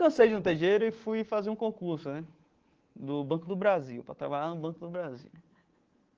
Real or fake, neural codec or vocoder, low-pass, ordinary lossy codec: fake; codec, 24 kHz, 3.1 kbps, DualCodec; 7.2 kHz; Opus, 16 kbps